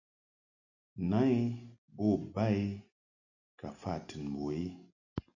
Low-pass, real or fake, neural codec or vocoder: 7.2 kHz; real; none